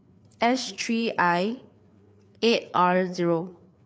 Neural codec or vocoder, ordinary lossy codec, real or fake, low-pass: codec, 16 kHz, 4 kbps, FreqCodec, larger model; none; fake; none